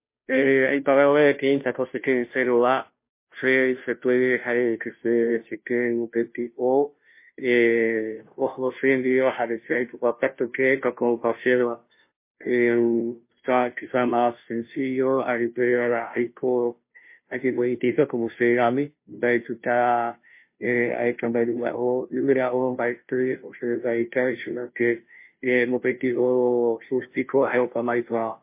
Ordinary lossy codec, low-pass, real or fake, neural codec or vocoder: MP3, 24 kbps; 3.6 kHz; fake; codec, 16 kHz, 0.5 kbps, FunCodec, trained on Chinese and English, 25 frames a second